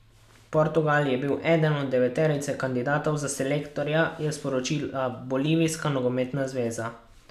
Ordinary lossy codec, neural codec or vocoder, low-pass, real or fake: none; none; 14.4 kHz; real